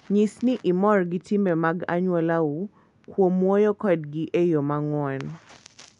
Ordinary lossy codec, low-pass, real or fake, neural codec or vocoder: none; 10.8 kHz; real; none